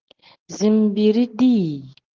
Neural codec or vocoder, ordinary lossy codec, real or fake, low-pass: none; Opus, 16 kbps; real; 7.2 kHz